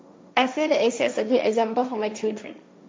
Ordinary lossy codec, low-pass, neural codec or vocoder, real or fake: none; none; codec, 16 kHz, 1.1 kbps, Voila-Tokenizer; fake